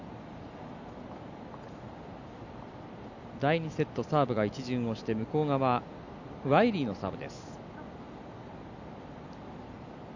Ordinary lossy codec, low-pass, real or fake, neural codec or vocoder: none; 7.2 kHz; real; none